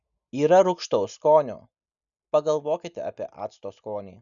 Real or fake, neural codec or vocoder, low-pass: real; none; 7.2 kHz